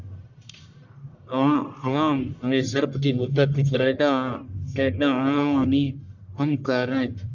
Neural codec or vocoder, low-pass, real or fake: codec, 44.1 kHz, 1.7 kbps, Pupu-Codec; 7.2 kHz; fake